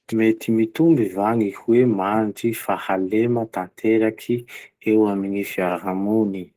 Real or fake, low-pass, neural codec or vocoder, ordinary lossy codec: real; 14.4 kHz; none; Opus, 16 kbps